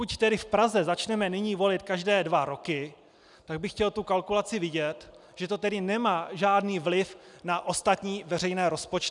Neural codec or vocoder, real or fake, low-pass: none; real; 10.8 kHz